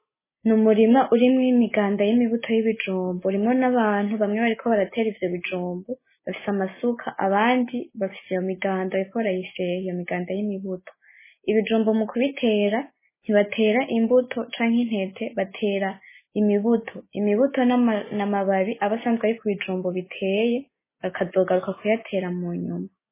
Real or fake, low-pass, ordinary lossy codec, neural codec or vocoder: real; 3.6 kHz; MP3, 16 kbps; none